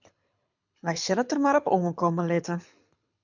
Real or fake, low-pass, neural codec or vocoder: fake; 7.2 kHz; codec, 24 kHz, 6 kbps, HILCodec